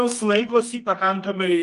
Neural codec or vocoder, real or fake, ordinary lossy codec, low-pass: codec, 24 kHz, 0.9 kbps, WavTokenizer, medium music audio release; fake; Opus, 32 kbps; 10.8 kHz